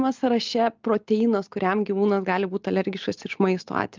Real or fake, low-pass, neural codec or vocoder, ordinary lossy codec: real; 7.2 kHz; none; Opus, 24 kbps